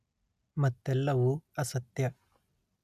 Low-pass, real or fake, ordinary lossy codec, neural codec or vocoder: 14.4 kHz; real; none; none